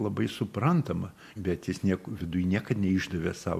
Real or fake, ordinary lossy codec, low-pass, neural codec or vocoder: real; MP3, 64 kbps; 14.4 kHz; none